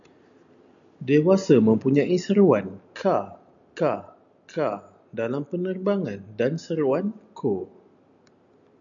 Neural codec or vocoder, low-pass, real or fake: none; 7.2 kHz; real